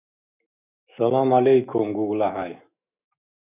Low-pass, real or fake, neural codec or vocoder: 3.6 kHz; fake; autoencoder, 48 kHz, 128 numbers a frame, DAC-VAE, trained on Japanese speech